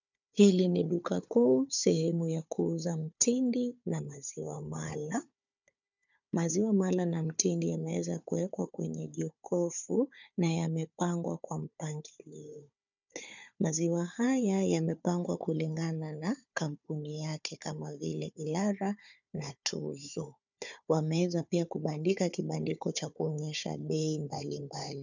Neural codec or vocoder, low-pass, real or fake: codec, 16 kHz, 4 kbps, FunCodec, trained on Chinese and English, 50 frames a second; 7.2 kHz; fake